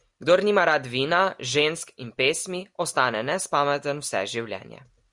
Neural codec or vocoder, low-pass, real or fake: none; 10.8 kHz; real